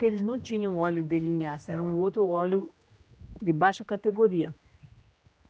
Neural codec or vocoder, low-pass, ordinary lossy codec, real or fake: codec, 16 kHz, 1 kbps, X-Codec, HuBERT features, trained on general audio; none; none; fake